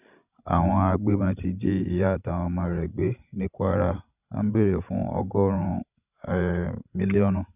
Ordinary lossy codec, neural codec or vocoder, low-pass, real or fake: none; codec, 16 kHz, 16 kbps, FreqCodec, larger model; 3.6 kHz; fake